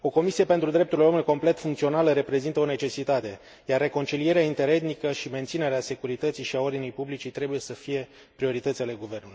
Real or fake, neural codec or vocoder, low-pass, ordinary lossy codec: real; none; none; none